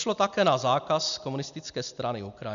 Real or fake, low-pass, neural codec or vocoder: real; 7.2 kHz; none